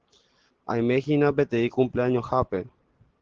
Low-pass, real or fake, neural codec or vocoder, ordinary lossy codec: 7.2 kHz; real; none; Opus, 16 kbps